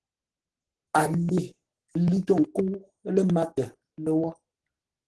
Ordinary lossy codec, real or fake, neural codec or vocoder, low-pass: Opus, 16 kbps; fake; codec, 44.1 kHz, 7.8 kbps, Pupu-Codec; 10.8 kHz